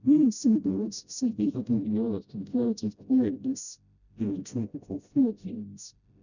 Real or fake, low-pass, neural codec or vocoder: fake; 7.2 kHz; codec, 16 kHz, 0.5 kbps, FreqCodec, smaller model